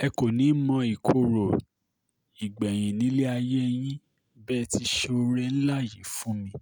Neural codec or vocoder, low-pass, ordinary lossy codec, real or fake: none; none; none; real